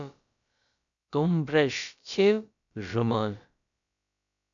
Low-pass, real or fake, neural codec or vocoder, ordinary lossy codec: 7.2 kHz; fake; codec, 16 kHz, about 1 kbps, DyCAST, with the encoder's durations; MP3, 96 kbps